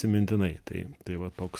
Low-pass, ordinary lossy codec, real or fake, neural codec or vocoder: 14.4 kHz; Opus, 32 kbps; real; none